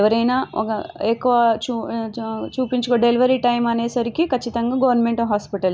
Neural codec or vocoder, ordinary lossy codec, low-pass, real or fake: none; none; none; real